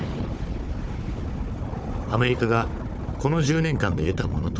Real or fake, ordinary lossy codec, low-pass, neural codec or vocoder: fake; none; none; codec, 16 kHz, 4 kbps, FunCodec, trained on Chinese and English, 50 frames a second